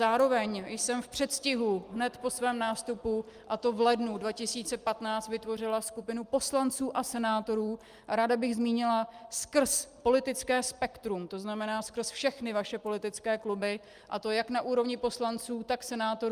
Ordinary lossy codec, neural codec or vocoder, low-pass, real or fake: Opus, 32 kbps; none; 14.4 kHz; real